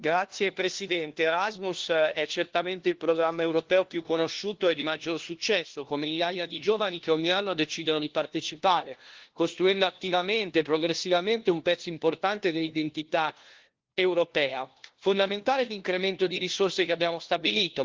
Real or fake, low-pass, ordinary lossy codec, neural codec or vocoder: fake; 7.2 kHz; Opus, 16 kbps; codec, 16 kHz, 1 kbps, FunCodec, trained on LibriTTS, 50 frames a second